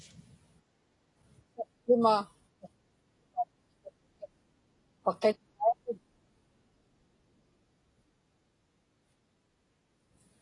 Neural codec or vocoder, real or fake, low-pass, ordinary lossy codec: none; real; 10.8 kHz; AAC, 32 kbps